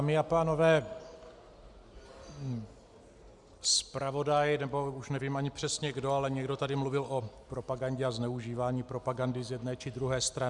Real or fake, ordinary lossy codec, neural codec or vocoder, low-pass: real; Opus, 64 kbps; none; 10.8 kHz